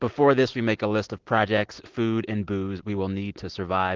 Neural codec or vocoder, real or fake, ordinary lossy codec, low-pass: none; real; Opus, 16 kbps; 7.2 kHz